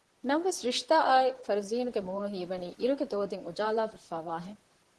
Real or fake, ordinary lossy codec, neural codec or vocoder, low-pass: fake; Opus, 16 kbps; vocoder, 44.1 kHz, 128 mel bands, Pupu-Vocoder; 10.8 kHz